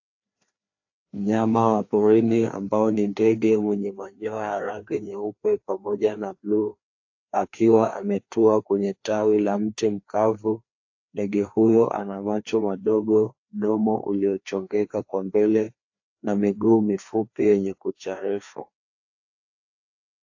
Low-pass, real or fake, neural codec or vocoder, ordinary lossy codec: 7.2 kHz; fake; codec, 16 kHz, 2 kbps, FreqCodec, larger model; AAC, 48 kbps